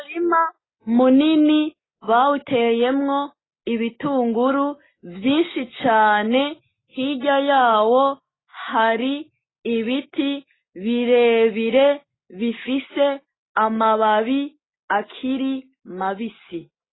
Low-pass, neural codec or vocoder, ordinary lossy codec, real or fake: 7.2 kHz; none; AAC, 16 kbps; real